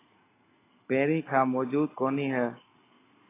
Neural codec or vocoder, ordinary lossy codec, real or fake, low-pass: none; AAC, 16 kbps; real; 3.6 kHz